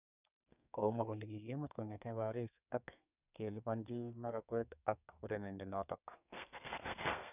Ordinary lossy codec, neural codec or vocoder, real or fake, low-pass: none; codec, 32 kHz, 1.9 kbps, SNAC; fake; 3.6 kHz